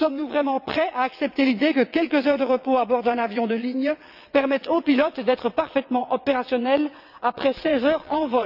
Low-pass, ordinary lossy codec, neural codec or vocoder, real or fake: 5.4 kHz; none; vocoder, 22.05 kHz, 80 mel bands, WaveNeXt; fake